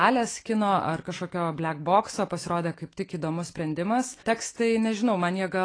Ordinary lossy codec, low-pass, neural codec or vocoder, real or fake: AAC, 32 kbps; 9.9 kHz; autoencoder, 48 kHz, 128 numbers a frame, DAC-VAE, trained on Japanese speech; fake